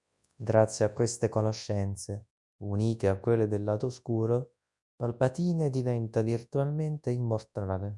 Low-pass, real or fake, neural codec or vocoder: 10.8 kHz; fake; codec, 24 kHz, 0.9 kbps, WavTokenizer, large speech release